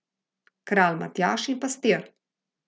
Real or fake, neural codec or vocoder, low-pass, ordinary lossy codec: real; none; none; none